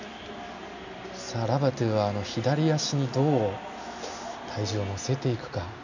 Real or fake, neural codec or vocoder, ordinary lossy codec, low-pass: real; none; none; 7.2 kHz